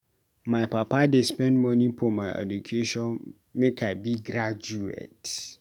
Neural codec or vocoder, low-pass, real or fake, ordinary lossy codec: codec, 44.1 kHz, 7.8 kbps, DAC; 19.8 kHz; fake; none